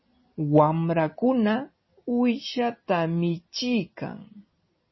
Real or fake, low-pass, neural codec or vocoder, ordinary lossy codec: real; 7.2 kHz; none; MP3, 24 kbps